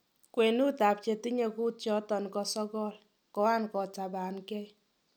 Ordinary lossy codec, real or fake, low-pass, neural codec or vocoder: none; real; none; none